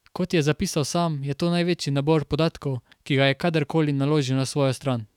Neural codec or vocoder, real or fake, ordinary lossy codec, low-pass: autoencoder, 48 kHz, 128 numbers a frame, DAC-VAE, trained on Japanese speech; fake; none; 19.8 kHz